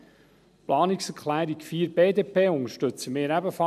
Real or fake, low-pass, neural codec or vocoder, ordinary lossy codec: real; 14.4 kHz; none; none